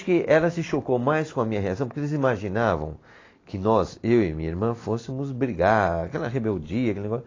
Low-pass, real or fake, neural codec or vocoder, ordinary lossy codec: 7.2 kHz; real; none; AAC, 32 kbps